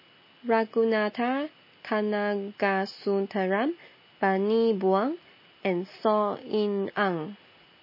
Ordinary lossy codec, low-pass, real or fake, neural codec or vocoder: MP3, 24 kbps; 5.4 kHz; real; none